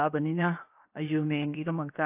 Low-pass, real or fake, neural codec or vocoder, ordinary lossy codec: 3.6 kHz; fake; codec, 16 kHz, 0.7 kbps, FocalCodec; AAC, 24 kbps